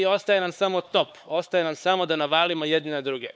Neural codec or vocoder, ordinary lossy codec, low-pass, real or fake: codec, 16 kHz, 4 kbps, X-Codec, HuBERT features, trained on LibriSpeech; none; none; fake